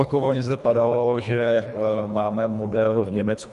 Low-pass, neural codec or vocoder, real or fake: 10.8 kHz; codec, 24 kHz, 1.5 kbps, HILCodec; fake